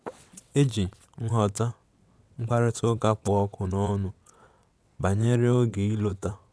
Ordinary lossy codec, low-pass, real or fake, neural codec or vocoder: none; none; fake; vocoder, 22.05 kHz, 80 mel bands, WaveNeXt